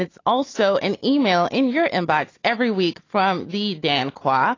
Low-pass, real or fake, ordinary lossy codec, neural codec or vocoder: 7.2 kHz; real; AAC, 32 kbps; none